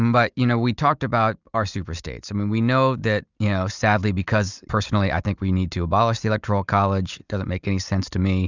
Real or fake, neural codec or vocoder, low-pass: real; none; 7.2 kHz